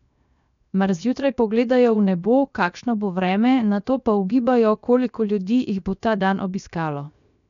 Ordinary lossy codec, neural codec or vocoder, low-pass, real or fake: none; codec, 16 kHz, 0.7 kbps, FocalCodec; 7.2 kHz; fake